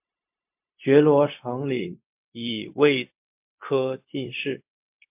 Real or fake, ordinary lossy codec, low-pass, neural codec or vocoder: fake; MP3, 32 kbps; 3.6 kHz; codec, 16 kHz, 0.4 kbps, LongCat-Audio-Codec